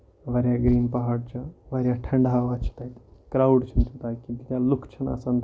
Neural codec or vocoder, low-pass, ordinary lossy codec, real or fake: none; none; none; real